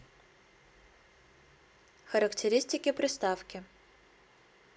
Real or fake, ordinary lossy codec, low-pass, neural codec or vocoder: real; none; none; none